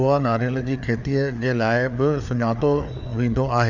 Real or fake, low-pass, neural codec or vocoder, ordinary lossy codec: fake; 7.2 kHz; codec, 16 kHz, 4 kbps, FreqCodec, larger model; none